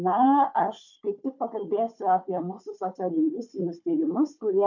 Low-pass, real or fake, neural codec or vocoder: 7.2 kHz; fake; codec, 16 kHz, 4 kbps, FunCodec, trained on Chinese and English, 50 frames a second